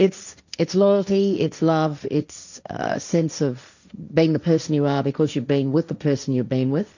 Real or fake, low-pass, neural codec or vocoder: fake; 7.2 kHz; codec, 16 kHz, 1.1 kbps, Voila-Tokenizer